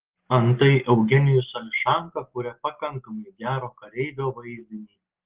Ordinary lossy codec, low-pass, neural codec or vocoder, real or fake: Opus, 16 kbps; 3.6 kHz; none; real